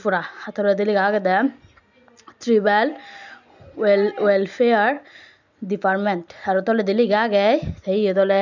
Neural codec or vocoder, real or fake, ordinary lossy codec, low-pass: none; real; none; 7.2 kHz